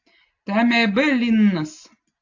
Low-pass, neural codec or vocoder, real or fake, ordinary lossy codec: 7.2 kHz; none; real; AAC, 48 kbps